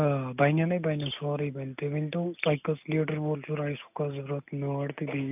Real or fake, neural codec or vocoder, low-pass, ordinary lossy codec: real; none; 3.6 kHz; none